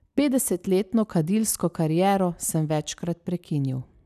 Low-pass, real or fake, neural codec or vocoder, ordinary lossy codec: 14.4 kHz; real; none; none